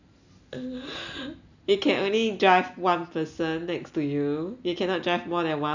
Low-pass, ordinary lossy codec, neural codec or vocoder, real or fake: 7.2 kHz; none; none; real